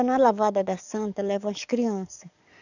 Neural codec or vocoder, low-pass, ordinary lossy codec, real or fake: codec, 44.1 kHz, 7.8 kbps, DAC; 7.2 kHz; none; fake